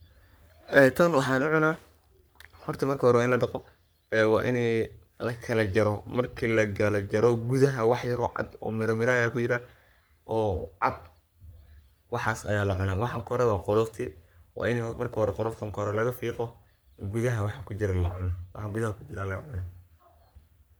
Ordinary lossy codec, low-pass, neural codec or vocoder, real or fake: none; none; codec, 44.1 kHz, 3.4 kbps, Pupu-Codec; fake